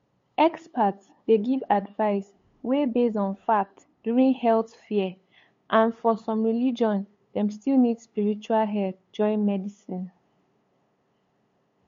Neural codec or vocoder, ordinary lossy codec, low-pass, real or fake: codec, 16 kHz, 8 kbps, FunCodec, trained on LibriTTS, 25 frames a second; MP3, 48 kbps; 7.2 kHz; fake